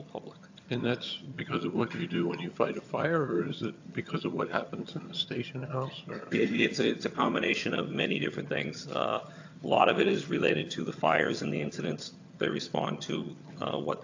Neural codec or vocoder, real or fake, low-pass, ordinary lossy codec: vocoder, 22.05 kHz, 80 mel bands, HiFi-GAN; fake; 7.2 kHz; AAC, 48 kbps